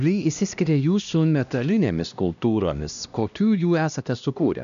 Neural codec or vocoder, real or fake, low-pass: codec, 16 kHz, 1 kbps, X-Codec, HuBERT features, trained on LibriSpeech; fake; 7.2 kHz